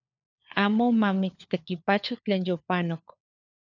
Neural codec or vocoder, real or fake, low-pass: codec, 16 kHz, 4 kbps, FunCodec, trained on LibriTTS, 50 frames a second; fake; 7.2 kHz